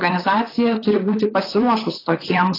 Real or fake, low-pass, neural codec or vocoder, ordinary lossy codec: fake; 5.4 kHz; vocoder, 44.1 kHz, 128 mel bands, Pupu-Vocoder; AAC, 24 kbps